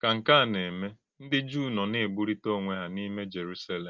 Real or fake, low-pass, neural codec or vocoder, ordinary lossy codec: real; 7.2 kHz; none; Opus, 32 kbps